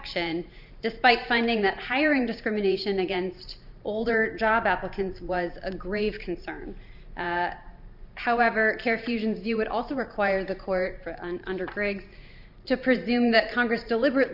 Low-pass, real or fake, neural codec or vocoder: 5.4 kHz; fake; vocoder, 44.1 kHz, 128 mel bands every 512 samples, BigVGAN v2